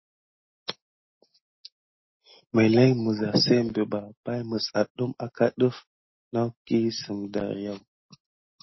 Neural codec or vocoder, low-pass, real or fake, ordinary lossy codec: none; 7.2 kHz; real; MP3, 24 kbps